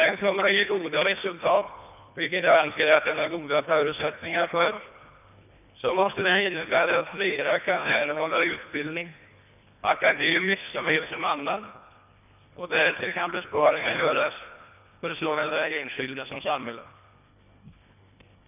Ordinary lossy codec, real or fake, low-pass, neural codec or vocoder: none; fake; 3.6 kHz; codec, 24 kHz, 1.5 kbps, HILCodec